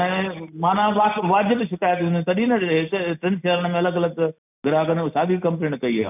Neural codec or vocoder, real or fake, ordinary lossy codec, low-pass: none; real; none; 3.6 kHz